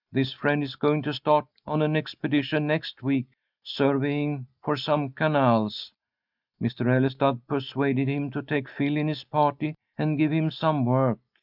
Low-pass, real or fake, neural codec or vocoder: 5.4 kHz; real; none